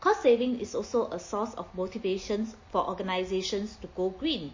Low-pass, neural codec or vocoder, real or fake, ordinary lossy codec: 7.2 kHz; none; real; MP3, 32 kbps